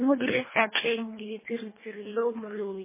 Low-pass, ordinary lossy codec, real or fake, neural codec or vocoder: 3.6 kHz; MP3, 16 kbps; fake; codec, 24 kHz, 1.5 kbps, HILCodec